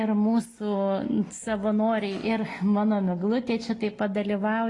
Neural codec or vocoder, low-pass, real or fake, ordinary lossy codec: none; 10.8 kHz; real; AAC, 32 kbps